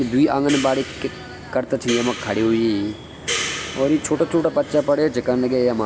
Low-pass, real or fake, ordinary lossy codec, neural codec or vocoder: none; real; none; none